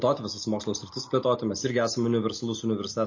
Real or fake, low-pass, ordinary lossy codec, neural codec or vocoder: real; 7.2 kHz; MP3, 32 kbps; none